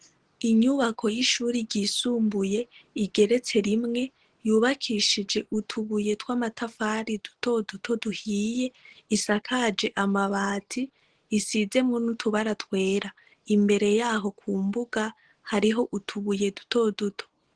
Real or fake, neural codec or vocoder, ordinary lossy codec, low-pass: real; none; Opus, 16 kbps; 9.9 kHz